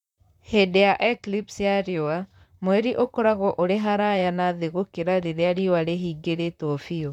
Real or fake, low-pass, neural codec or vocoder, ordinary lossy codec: fake; 19.8 kHz; vocoder, 44.1 kHz, 128 mel bands, Pupu-Vocoder; none